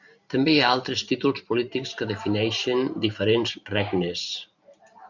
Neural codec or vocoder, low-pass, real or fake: vocoder, 44.1 kHz, 128 mel bands every 512 samples, BigVGAN v2; 7.2 kHz; fake